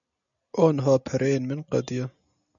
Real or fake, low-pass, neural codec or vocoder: real; 7.2 kHz; none